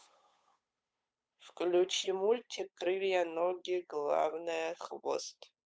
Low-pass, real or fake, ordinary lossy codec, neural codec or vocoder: none; fake; none; codec, 16 kHz, 8 kbps, FunCodec, trained on Chinese and English, 25 frames a second